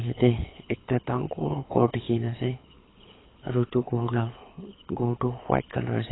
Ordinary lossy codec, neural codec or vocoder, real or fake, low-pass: AAC, 16 kbps; codec, 24 kHz, 6 kbps, HILCodec; fake; 7.2 kHz